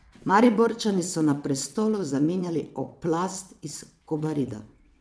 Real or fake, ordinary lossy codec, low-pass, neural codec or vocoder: fake; none; none; vocoder, 22.05 kHz, 80 mel bands, WaveNeXt